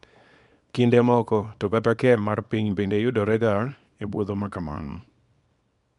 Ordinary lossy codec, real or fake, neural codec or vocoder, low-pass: none; fake; codec, 24 kHz, 0.9 kbps, WavTokenizer, small release; 10.8 kHz